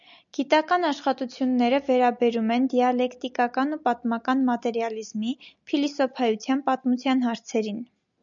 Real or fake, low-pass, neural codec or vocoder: real; 7.2 kHz; none